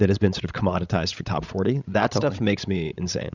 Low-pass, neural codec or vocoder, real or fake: 7.2 kHz; codec, 16 kHz, 16 kbps, FreqCodec, larger model; fake